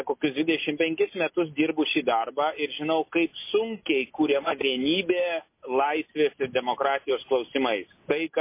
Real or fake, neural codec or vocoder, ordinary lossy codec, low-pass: real; none; MP3, 24 kbps; 3.6 kHz